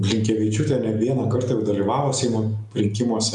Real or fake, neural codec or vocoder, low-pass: real; none; 10.8 kHz